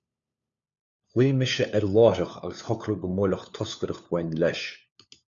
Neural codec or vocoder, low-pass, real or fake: codec, 16 kHz, 4 kbps, FunCodec, trained on LibriTTS, 50 frames a second; 7.2 kHz; fake